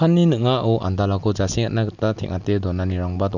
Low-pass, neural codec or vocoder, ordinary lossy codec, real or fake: 7.2 kHz; none; none; real